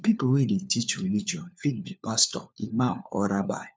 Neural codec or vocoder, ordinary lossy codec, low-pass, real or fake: codec, 16 kHz, 4 kbps, FunCodec, trained on LibriTTS, 50 frames a second; none; none; fake